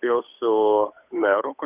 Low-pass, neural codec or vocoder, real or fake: 3.6 kHz; codec, 16 kHz, 8 kbps, FunCodec, trained on Chinese and English, 25 frames a second; fake